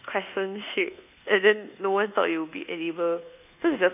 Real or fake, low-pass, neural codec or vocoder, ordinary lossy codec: fake; 3.6 kHz; codec, 24 kHz, 1.2 kbps, DualCodec; none